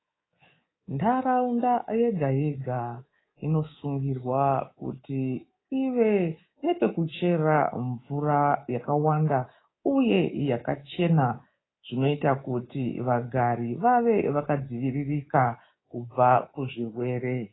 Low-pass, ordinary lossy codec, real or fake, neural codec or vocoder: 7.2 kHz; AAC, 16 kbps; fake; codec, 24 kHz, 3.1 kbps, DualCodec